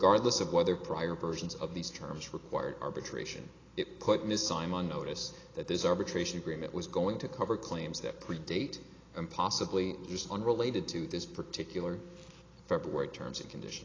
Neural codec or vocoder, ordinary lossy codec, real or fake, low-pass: none; AAC, 32 kbps; real; 7.2 kHz